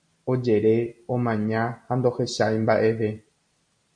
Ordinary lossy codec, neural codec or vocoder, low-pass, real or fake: MP3, 48 kbps; none; 9.9 kHz; real